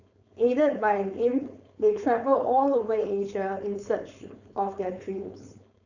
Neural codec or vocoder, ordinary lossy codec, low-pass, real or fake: codec, 16 kHz, 4.8 kbps, FACodec; none; 7.2 kHz; fake